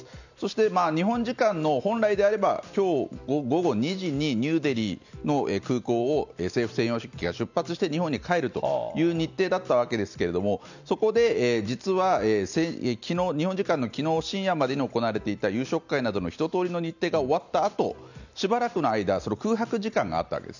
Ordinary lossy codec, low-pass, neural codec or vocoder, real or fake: none; 7.2 kHz; none; real